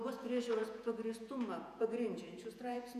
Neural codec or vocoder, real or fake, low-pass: vocoder, 48 kHz, 128 mel bands, Vocos; fake; 14.4 kHz